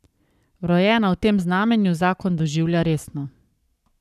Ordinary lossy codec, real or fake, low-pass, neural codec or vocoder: none; fake; 14.4 kHz; codec, 44.1 kHz, 7.8 kbps, Pupu-Codec